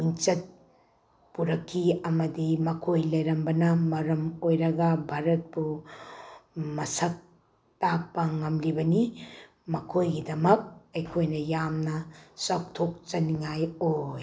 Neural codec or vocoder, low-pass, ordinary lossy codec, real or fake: none; none; none; real